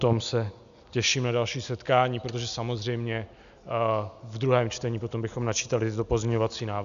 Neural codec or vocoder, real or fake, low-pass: none; real; 7.2 kHz